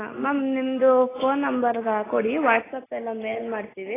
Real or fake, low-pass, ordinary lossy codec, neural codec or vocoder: real; 3.6 kHz; AAC, 16 kbps; none